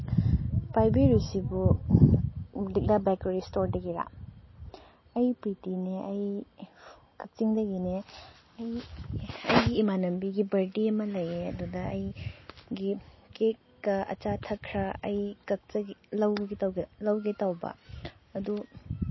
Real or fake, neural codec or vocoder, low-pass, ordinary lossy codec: real; none; 7.2 kHz; MP3, 24 kbps